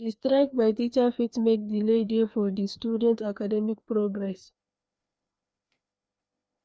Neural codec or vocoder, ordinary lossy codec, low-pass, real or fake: codec, 16 kHz, 2 kbps, FreqCodec, larger model; none; none; fake